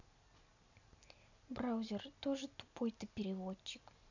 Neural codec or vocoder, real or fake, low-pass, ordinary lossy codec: none; real; 7.2 kHz; none